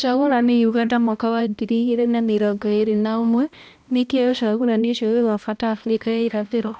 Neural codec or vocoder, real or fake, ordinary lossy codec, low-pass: codec, 16 kHz, 1 kbps, X-Codec, HuBERT features, trained on balanced general audio; fake; none; none